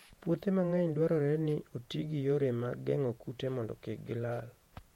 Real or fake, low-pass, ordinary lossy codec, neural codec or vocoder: fake; 19.8 kHz; MP3, 64 kbps; vocoder, 48 kHz, 128 mel bands, Vocos